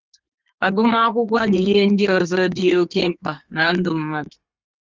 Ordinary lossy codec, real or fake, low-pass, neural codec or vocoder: Opus, 32 kbps; fake; 7.2 kHz; codec, 32 kHz, 1.9 kbps, SNAC